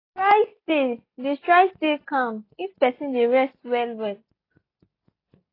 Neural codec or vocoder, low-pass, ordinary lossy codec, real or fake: none; 5.4 kHz; AAC, 24 kbps; real